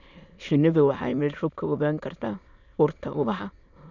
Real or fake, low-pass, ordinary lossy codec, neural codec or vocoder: fake; 7.2 kHz; none; autoencoder, 22.05 kHz, a latent of 192 numbers a frame, VITS, trained on many speakers